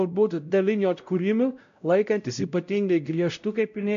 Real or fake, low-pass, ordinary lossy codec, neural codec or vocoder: fake; 7.2 kHz; MP3, 64 kbps; codec, 16 kHz, 0.5 kbps, X-Codec, WavLM features, trained on Multilingual LibriSpeech